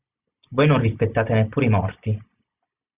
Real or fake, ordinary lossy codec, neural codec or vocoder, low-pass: real; Opus, 64 kbps; none; 3.6 kHz